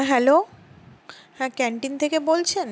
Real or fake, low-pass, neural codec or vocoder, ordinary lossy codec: real; none; none; none